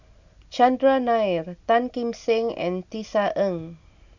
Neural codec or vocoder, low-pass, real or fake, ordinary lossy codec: none; 7.2 kHz; real; none